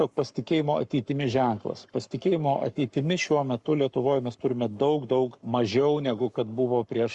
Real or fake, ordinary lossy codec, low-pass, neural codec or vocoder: fake; Opus, 64 kbps; 10.8 kHz; codec, 44.1 kHz, 7.8 kbps, Pupu-Codec